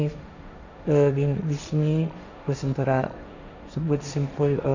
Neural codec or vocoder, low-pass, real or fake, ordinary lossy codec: codec, 16 kHz, 1.1 kbps, Voila-Tokenizer; 7.2 kHz; fake; none